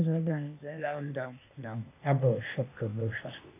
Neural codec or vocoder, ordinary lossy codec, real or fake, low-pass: codec, 16 kHz, 0.8 kbps, ZipCodec; AAC, 24 kbps; fake; 3.6 kHz